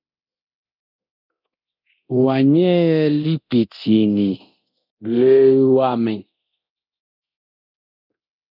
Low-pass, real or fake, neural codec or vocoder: 5.4 kHz; fake; codec, 24 kHz, 0.9 kbps, DualCodec